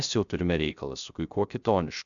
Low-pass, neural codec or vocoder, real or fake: 7.2 kHz; codec, 16 kHz, 0.3 kbps, FocalCodec; fake